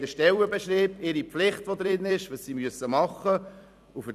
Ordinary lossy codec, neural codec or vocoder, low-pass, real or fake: none; vocoder, 44.1 kHz, 128 mel bands every 256 samples, BigVGAN v2; 14.4 kHz; fake